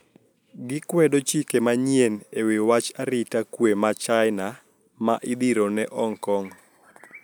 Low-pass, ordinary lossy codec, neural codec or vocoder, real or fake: none; none; none; real